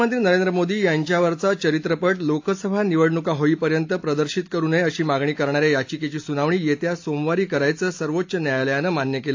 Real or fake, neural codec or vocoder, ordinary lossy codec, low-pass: real; none; AAC, 48 kbps; 7.2 kHz